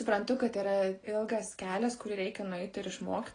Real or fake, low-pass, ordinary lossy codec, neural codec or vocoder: real; 9.9 kHz; AAC, 32 kbps; none